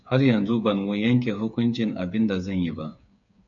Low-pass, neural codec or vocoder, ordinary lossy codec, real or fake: 7.2 kHz; codec, 16 kHz, 8 kbps, FreqCodec, smaller model; AAC, 64 kbps; fake